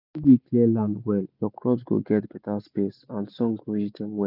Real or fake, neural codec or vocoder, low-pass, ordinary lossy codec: real; none; 5.4 kHz; none